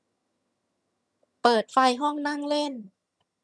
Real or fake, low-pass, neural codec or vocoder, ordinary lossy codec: fake; none; vocoder, 22.05 kHz, 80 mel bands, HiFi-GAN; none